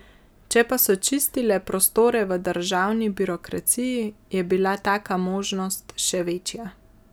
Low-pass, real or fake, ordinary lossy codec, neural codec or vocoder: none; real; none; none